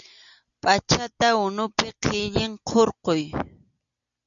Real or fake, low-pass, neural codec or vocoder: real; 7.2 kHz; none